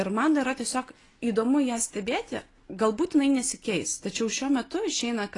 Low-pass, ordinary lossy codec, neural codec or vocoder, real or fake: 10.8 kHz; AAC, 32 kbps; none; real